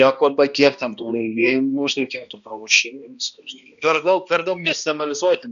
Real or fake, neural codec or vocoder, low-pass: fake; codec, 16 kHz, 1 kbps, X-Codec, HuBERT features, trained on balanced general audio; 7.2 kHz